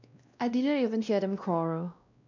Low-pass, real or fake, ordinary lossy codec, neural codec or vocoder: 7.2 kHz; fake; none; codec, 16 kHz, 1 kbps, X-Codec, WavLM features, trained on Multilingual LibriSpeech